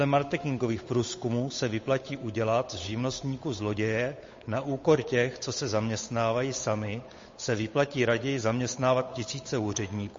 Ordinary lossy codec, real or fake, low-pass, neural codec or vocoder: MP3, 32 kbps; fake; 7.2 kHz; codec, 16 kHz, 8 kbps, FunCodec, trained on Chinese and English, 25 frames a second